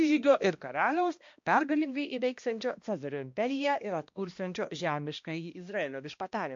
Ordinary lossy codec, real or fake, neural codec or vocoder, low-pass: MP3, 48 kbps; fake; codec, 16 kHz, 1 kbps, X-Codec, HuBERT features, trained on balanced general audio; 7.2 kHz